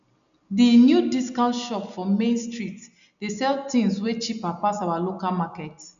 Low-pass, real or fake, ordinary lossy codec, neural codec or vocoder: 7.2 kHz; real; none; none